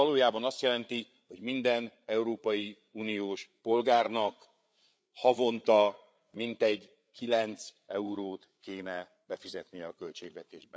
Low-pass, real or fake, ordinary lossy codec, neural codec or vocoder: none; fake; none; codec, 16 kHz, 8 kbps, FreqCodec, larger model